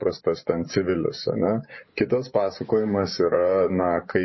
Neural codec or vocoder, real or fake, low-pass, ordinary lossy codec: vocoder, 24 kHz, 100 mel bands, Vocos; fake; 7.2 kHz; MP3, 24 kbps